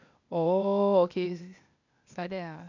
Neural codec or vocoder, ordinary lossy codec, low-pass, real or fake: codec, 16 kHz, 0.8 kbps, ZipCodec; none; 7.2 kHz; fake